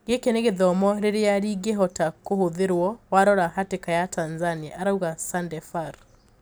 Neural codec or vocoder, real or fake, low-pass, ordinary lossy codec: none; real; none; none